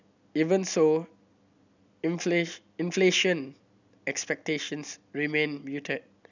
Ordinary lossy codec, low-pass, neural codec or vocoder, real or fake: none; 7.2 kHz; none; real